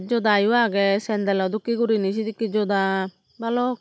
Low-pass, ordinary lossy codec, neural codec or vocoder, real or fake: none; none; none; real